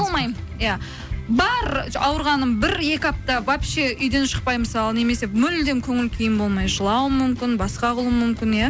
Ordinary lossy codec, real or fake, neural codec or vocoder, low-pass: none; real; none; none